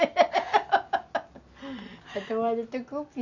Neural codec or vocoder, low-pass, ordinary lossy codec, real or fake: none; 7.2 kHz; none; real